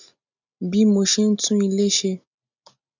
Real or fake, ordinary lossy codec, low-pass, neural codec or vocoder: real; none; 7.2 kHz; none